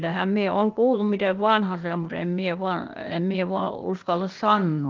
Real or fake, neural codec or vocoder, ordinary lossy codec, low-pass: fake; codec, 16 kHz, 0.8 kbps, ZipCodec; Opus, 32 kbps; 7.2 kHz